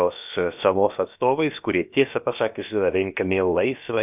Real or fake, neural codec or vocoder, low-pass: fake; codec, 16 kHz, about 1 kbps, DyCAST, with the encoder's durations; 3.6 kHz